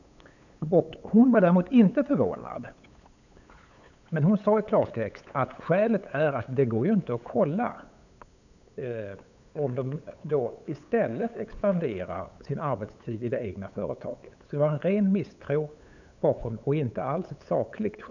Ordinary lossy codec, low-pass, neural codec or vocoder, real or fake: none; 7.2 kHz; codec, 16 kHz, 8 kbps, FunCodec, trained on LibriTTS, 25 frames a second; fake